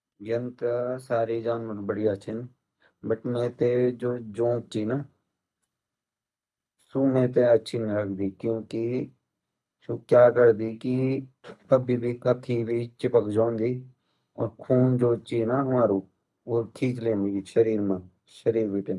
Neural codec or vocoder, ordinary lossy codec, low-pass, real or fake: codec, 24 kHz, 6 kbps, HILCodec; none; none; fake